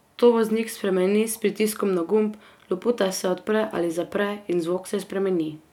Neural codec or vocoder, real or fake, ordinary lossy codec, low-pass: none; real; none; 19.8 kHz